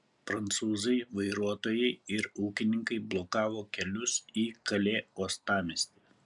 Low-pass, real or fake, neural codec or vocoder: 10.8 kHz; real; none